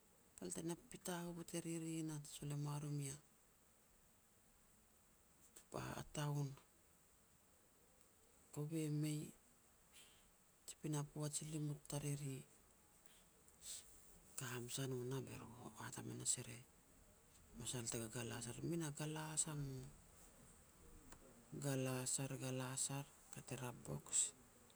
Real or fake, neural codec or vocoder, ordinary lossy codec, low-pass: real; none; none; none